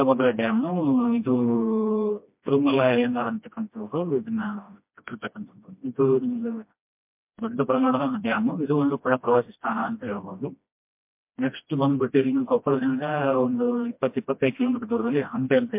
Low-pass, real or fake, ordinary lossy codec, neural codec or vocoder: 3.6 kHz; fake; MP3, 32 kbps; codec, 16 kHz, 1 kbps, FreqCodec, smaller model